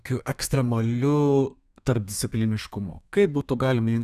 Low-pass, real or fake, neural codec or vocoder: 14.4 kHz; fake; codec, 32 kHz, 1.9 kbps, SNAC